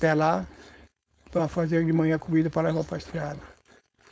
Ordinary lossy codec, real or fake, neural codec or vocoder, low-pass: none; fake; codec, 16 kHz, 4.8 kbps, FACodec; none